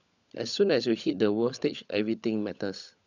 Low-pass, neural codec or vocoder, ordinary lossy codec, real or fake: 7.2 kHz; codec, 16 kHz, 16 kbps, FunCodec, trained on LibriTTS, 50 frames a second; none; fake